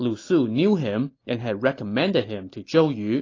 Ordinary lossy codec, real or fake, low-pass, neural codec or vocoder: AAC, 32 kbps; real; 7.2 kHz; none